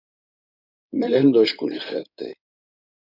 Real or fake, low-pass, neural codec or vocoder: fake; 5.4 kHz; codec, 16 kHz in and 24 kHz out, 2.2 kbps, FireRedTTS-2 codec